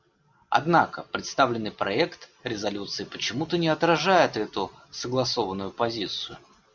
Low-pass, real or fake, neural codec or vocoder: 7.2 kHz; real; none